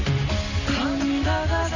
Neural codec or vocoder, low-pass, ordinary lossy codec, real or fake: none; 7.2 kHz; none; real